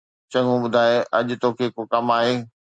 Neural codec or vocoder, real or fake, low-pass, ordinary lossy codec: none; real; 9.9 kHz; Opus, 64 kbps